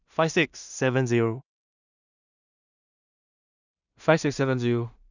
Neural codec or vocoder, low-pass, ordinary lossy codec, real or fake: codec, 16 kHz in and 24 kHz out, 0.4 kbps, LongCat-Audio-Codec, two codebook decoder; 7.2 kHz; none; fake